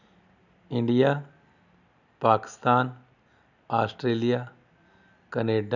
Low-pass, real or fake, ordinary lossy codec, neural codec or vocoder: 7.2 kHz; real; none; none